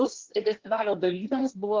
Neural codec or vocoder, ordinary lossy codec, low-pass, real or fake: codec, 24 kHz, 1 kbps, SNAC; Opus, 16 kbps; 7.2 kHz; fake